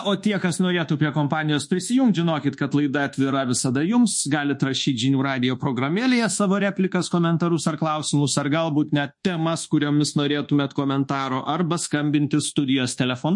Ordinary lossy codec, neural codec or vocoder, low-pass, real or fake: MP3, 48 kbps; codec, 24 kHz, 1.2 kbps, DualCodec; 10.8 kHz; fake